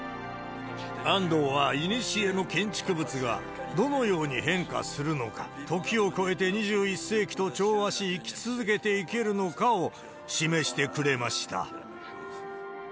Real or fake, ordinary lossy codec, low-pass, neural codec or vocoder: real; none; none; none